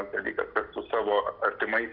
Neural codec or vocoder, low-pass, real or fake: none; 5.4 kHz; real